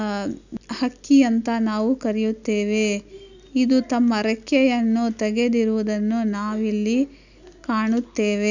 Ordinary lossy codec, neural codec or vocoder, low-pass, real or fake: none; none; 7.2 kHz; real